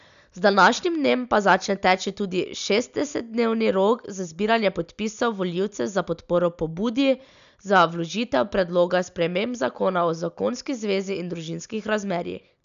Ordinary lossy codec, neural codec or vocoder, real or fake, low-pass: none; none; real; 7.2 kHz